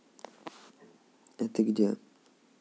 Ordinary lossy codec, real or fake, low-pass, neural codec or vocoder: none; real; none; none